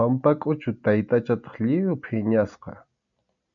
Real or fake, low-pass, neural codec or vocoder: real; 7.2 kHz; none